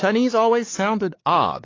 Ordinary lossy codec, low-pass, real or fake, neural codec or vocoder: AAC, 32 kbps; 7.2 kHz; fake; codec, 16 kHz, 4 kbps, X-Codec, WavLM features, trained on Multilingual LibriSpeech